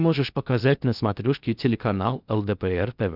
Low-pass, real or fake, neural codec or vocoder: 5.4 kHz; fake; codec, 16 kHz in and 24 kHz out, 0.6 kbps, FocalCodec, streaming, 2048 codes